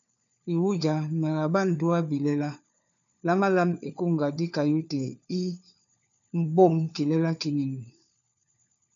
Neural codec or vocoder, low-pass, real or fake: codec, 16 kHz, 4 kbps, FunCodec, trained on LibriTTS, 50 frames a second; 7.2 kHz; fake